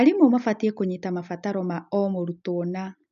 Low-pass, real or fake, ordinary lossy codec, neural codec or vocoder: 7.2 kHz; real; none; none